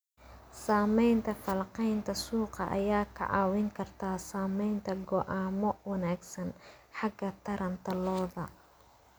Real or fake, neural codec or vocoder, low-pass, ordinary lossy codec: real; none; none; none